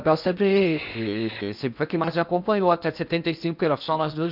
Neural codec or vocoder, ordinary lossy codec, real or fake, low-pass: codec, 16 kHz in and 24 kHz out, 0.6 kbps, FocalCodec, streaming, 4096 codes; none; fake; 5.4 kHz